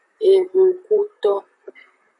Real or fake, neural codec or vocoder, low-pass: fake; vocoder, 44.1 kHz, 128 mel bands, Pupu-Vocoder; 10.8 kHz